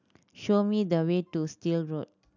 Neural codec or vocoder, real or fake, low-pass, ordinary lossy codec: none; real; 7.2 kHz; none